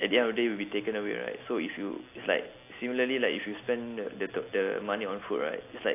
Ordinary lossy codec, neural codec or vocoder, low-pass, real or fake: AAC, 24 kbps; none; 3.6 kHz; real